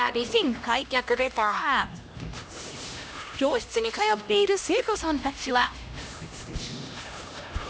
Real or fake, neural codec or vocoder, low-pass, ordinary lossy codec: fake; codec, 16 kHz, 1 kbps, X-Codec, HuBERT features, trained on LibriSpeech; none; none